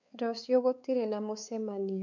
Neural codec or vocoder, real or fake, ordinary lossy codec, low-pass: codec, 16 kHz, 4 kbps, X-Codec, WavLM features, trained on Multilingual LibriSpeech; fake; none; 7.2 kHz